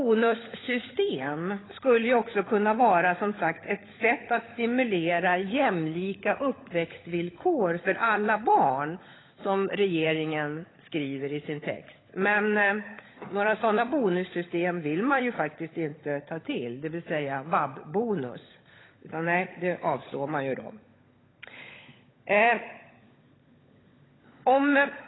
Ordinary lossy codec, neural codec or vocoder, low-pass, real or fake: AAC, 16 kbps; codec, 16 kHz, 16 kbps, FunCodec, trained on LibriTTS, 50 frames a second; 7.2 kHz; fake